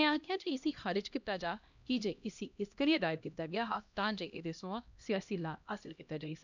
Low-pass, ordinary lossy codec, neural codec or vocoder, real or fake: 7.2 kHz; none; codec, 16 kHz, 1 kbps, X-Codec, HuBERT features, trained on LibriSpeech; fake